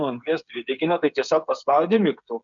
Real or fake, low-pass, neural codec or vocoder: fake; 7.2 kHz; codec, 16 kHz, 8 kbps, FreqCodec, smaller model